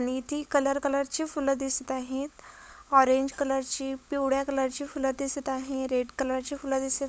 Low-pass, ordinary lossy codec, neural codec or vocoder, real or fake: none; none; codec, 16 kHz, 16 kbps, FunCodec, trained on LibriTTS, 50 frames a second; fake